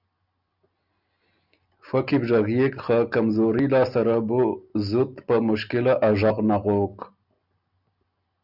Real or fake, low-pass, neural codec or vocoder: real; 5.4 kHz; none